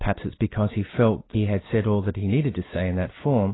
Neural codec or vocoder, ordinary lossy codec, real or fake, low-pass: none; AAC, 16 kbps; real; 7.2 kHz